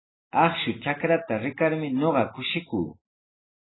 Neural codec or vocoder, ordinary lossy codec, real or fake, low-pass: none; AAC, 16 kbps; real; 7.2 kHz